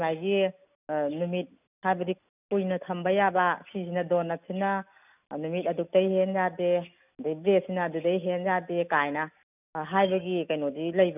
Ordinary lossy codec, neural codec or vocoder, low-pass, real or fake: none; none; 3.6 kHz; real